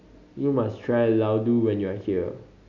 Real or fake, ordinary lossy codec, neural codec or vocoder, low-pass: real; none; none; 7.2 kHz